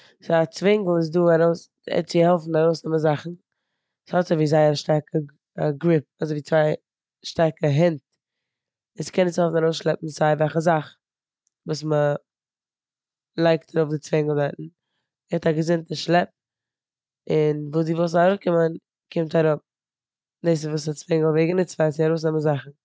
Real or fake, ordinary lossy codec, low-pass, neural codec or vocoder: real; none; none; none